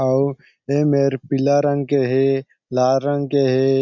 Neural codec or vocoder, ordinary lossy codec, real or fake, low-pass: none; none; real; 7.2 kHz